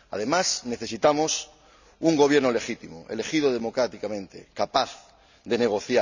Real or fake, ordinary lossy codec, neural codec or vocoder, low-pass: real; none; none; 7.2 kHz